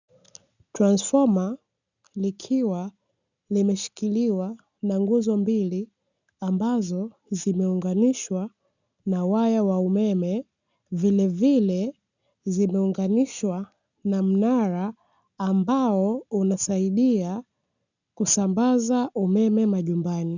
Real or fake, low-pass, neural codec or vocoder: real; 7.2 kHz; none